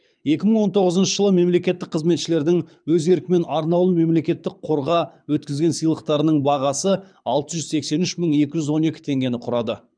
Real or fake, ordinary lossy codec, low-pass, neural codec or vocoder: fake; none; 9.9 kHz; codec, 24 kHz, 6 kbps, HILCodec